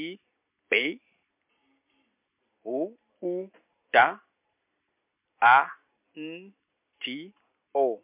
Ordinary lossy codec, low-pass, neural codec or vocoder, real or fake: MP3, 32 kbps; 3.6 kHz; none; real